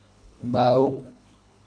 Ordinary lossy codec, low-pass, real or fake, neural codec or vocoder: AAC, 64 kbps; 9.9 kHz; fake; codec, 24 kHz, 1.5 kbps, HILCodec